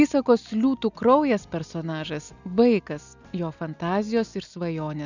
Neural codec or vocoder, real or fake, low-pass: none; real; 7.2 kHz